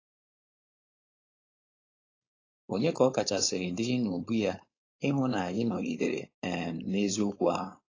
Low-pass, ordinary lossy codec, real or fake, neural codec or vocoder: 7.2 kHz; AAC, 32 kbps; fake; codec, 16 kHz, 4.8 kbps, FACodec